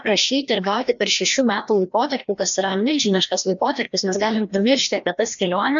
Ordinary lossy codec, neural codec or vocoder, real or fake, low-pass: MP3, 64 kbps; codec, 16 kHz, 1 kbps, FreqCodec, larger model; fake; 7.2 kHz